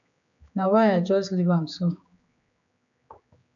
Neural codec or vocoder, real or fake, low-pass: codec, 16 kHz, 4 kbps, X-Codec, HuBERT features, trained on general audio; fake; 7.2 kHz